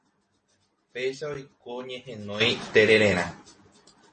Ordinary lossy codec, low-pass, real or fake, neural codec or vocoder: MP3, 32 kbps; 10.8 kHz; real; none